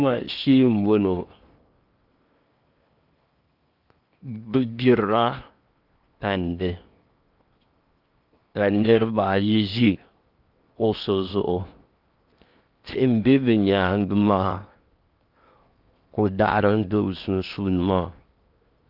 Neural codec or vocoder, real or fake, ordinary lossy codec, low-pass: codec, 16 kHz in and 24 kHz out, 0.8 kbps, FocalCodec, streaming, 65536 codes; fake; Opus, 24 kbps; 5.4 kHz